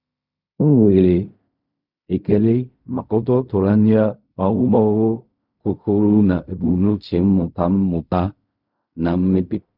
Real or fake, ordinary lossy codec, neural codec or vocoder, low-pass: fake; none; codec, 16 kHz in and 24 kHz out, 0.4 kbps, LongCat-Audio-Codec, fine tuned four codebook decoder; 5.4 kHz